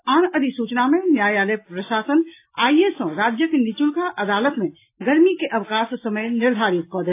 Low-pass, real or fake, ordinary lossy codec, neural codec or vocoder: 3.6 kHz; real; AAC, 24 kbps; none